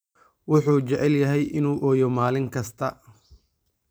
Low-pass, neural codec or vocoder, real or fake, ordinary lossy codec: none; none; real; none